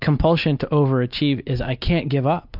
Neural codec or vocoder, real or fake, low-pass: none; real; 5.4 kHz